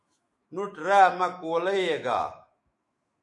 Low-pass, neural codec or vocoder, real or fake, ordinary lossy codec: 10.8 kHz; autoencoder, 48 kHz, 128 numbers a frame, DAC-VAE, trained on Japanese speech; fake; MP3, 48 kbps